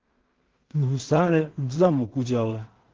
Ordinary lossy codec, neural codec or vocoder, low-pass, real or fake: Opus, 16 kbps; codec, 16 kHz in and 24 kHz out, 0.4 kbps, LongCat-Audio-Codec, two codebook decoder; 7.2 kHz; fake